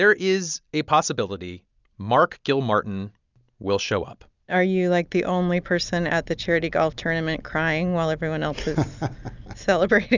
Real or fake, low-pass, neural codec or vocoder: real; 7.2 kHz; none